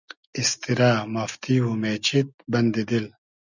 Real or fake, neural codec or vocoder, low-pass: real; none; 7.2 kHz